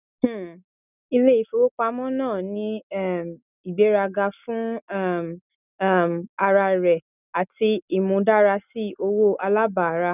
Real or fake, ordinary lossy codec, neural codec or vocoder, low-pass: real; none; none; 3.6 kHz